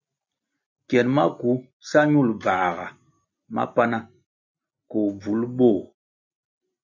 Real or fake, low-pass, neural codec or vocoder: real; 7.2 kHz; none